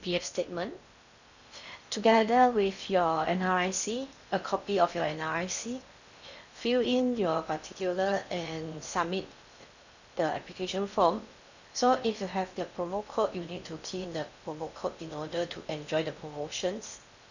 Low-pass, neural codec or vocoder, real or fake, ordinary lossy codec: 7.2 kHz; codec, 16 kHz in and 24 kHz out, 0.8 kbps, FocalCodec, streaming, 65536 codes; fake; none